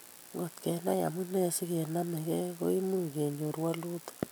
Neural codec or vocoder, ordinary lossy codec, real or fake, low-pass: none; none; real; none